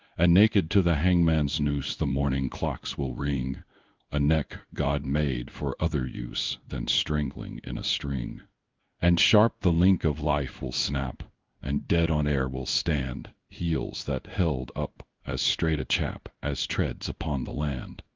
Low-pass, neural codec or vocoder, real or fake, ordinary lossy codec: 7.2 kHz; codec, 16 kHz in and 24 kHz out, 1 kbps, XY-Tokenizer; fake; Opus, 32 kbps